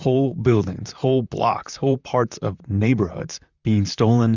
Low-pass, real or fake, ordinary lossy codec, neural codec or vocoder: 7.2 kHz; fake; Opus, 64 kbps; vocoder, 44.1 kHz, 128 mel bands, Pupu-Vocoder